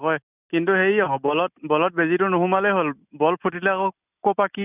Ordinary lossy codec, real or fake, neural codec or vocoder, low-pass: none; real; none; 3.6 kHz